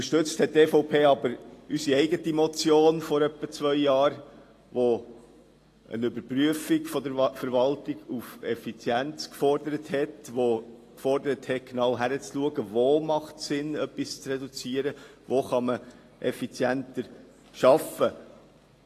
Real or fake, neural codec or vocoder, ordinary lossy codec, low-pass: real; none; AAC, 48 kbps; 14.4 kHz